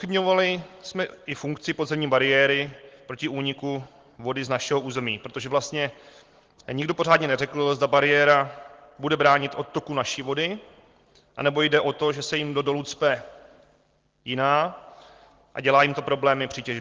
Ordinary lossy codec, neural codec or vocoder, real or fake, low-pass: Opus, 16 kbps; none; real; 7.2 kHz